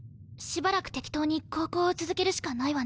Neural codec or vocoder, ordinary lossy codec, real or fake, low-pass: none; none; real; none